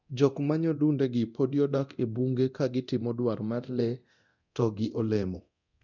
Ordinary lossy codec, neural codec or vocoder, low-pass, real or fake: none; codec, 24 kHz, 0.9 kbps, DualCodec; 7.2 kHz; fake